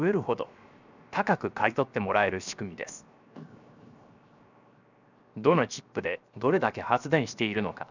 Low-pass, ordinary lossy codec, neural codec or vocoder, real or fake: 7.2 kHz; none; codec, 16 kHz, 0.7 kbps, FocalCodec; fake